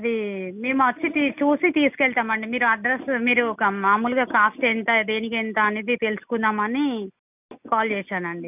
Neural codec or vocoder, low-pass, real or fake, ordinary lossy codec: none; 3.6 kHz; real; none